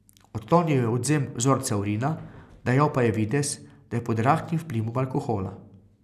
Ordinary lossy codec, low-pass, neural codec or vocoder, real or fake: none; 14.4 kHz; none; real